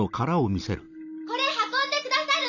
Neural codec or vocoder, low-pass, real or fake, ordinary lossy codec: none; 7.2 kHz; real; none